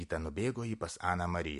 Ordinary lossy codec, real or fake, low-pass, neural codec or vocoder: MP3, 48 kbps; real; 14.4 kHz; none